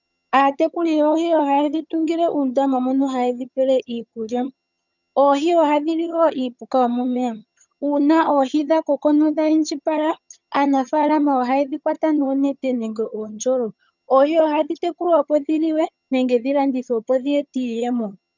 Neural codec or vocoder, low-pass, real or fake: vocoder, 22.05 kHz, 80 mel bands, HiFi-GAN; 7.2 kHz; fake